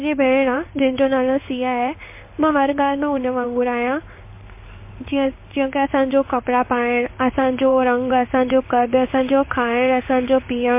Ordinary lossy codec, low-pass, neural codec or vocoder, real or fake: MP3, 24 kbps; 3.6 kHz; codec, 16 kHz in and 24 kHz out, 1 kbps, XY-Tokenizer; fake